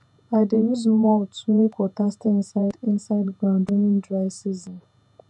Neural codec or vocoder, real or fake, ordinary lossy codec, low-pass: vocoder, 48 kHz, 128 mel bands, Vocos; fake; none; 10.8 kHz